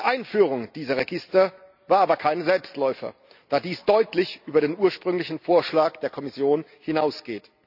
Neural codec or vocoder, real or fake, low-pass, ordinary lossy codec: none; real; 5.4 kHz; none